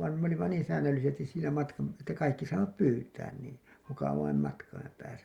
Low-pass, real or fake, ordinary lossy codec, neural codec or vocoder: 19.8 kHz; real; Opus, 64 kbps; none